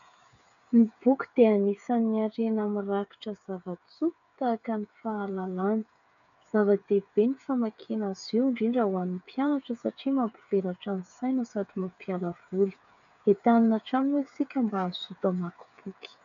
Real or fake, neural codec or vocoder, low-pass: fake; codec, 16 kHz, 8 kbps, FreqCodec, smaller model; 7.2 kHz